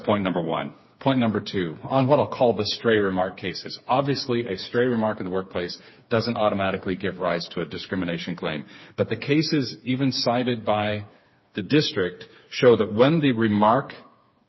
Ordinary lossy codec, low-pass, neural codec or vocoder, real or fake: MP3, 24 kbps; 7.2 kHz; codec, 16 kHz, 4 kbps, FreqCodec, smaller model; fake